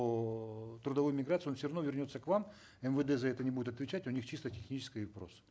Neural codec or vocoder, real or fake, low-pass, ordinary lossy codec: none; real; none; none